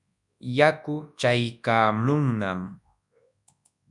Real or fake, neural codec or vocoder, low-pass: fake; codec, 24 kHz, 0.9 kbps, WavTokenizer, large speech release; 10.8 kHz